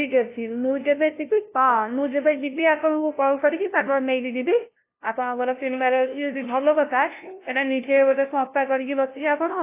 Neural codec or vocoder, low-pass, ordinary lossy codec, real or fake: codec, 16 kHz, 0.5 kbps, FunCodec, trained on LibriTTS, 25 frames a second; 3.6 kHz; AAC, 24 kbps; fake